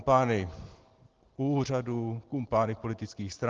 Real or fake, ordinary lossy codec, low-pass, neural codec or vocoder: real; Opus, 16 kbps; 7.2 kHz; none